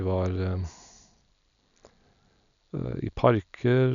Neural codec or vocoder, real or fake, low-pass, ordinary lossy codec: none; real; 7.2 kHz; none